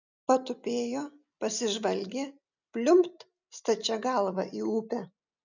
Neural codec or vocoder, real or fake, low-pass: none; real; 7.2 kHz